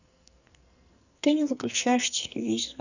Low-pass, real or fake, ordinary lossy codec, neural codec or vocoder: 7.2 kHz; fake; none; codec, 44.1 kHz, 2.6 kbps, SNAC